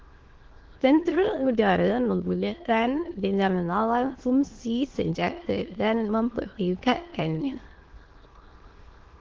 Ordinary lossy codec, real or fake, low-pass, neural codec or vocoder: Opus, 16 kbps; fake; 7.2 kHz; autoencoder, 22.05 kHz, a latent of 192 numbers a frame, VITS, trained on many speakers